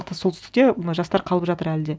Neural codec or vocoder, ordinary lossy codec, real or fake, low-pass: none; none; real; none